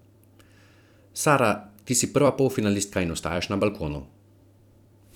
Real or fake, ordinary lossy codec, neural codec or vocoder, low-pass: fake; none; vocoder, 44.1 kHz, 128 mel bands every 256 samples, BigVGAN v2; 19.8 kHz